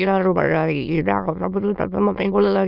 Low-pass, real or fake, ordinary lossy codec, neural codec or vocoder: 5.4 kHz; fake; none; autoencoder, 22.05 kHz, a latent of 192 numbers a frame, VITS, trained on many speakers